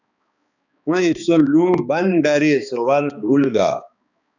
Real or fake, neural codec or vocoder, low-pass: fake; codec, 16 kHz, 2 kbps, X-Codec, HuBERT features, trained on balanced general audio; 7.2 kHz